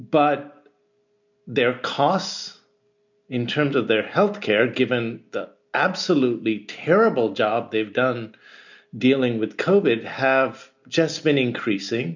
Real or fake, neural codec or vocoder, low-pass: real; none; 7.2 kHz